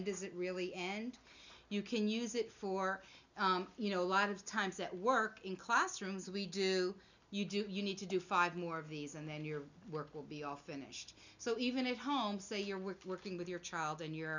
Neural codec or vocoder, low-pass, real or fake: none; 7.2 kHz; real